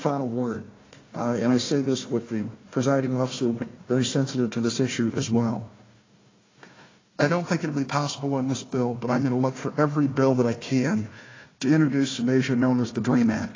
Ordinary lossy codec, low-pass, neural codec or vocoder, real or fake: AAC, 32 kbps; 7.2 kHz; codec, 16 kHz, 1 kbps, FunCodec, trained on Chinese and English, 50 frames a second; fake